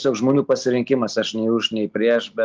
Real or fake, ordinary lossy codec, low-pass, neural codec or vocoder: real; Opus, 24 kbps; 7.2 kHz; none